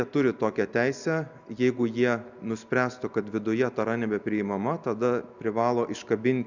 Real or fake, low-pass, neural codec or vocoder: real; 7.2 kHz; none